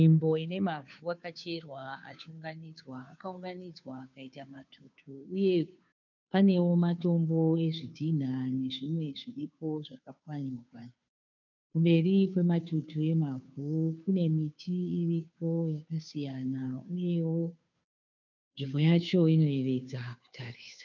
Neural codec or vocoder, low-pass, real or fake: codec, 16 kHz, 2 kbps, FunCodec, trained on Chinese and English, 25 frames a second; 7.2 kHz; fake